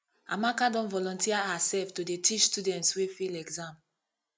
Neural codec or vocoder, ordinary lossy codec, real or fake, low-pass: none; none; real; none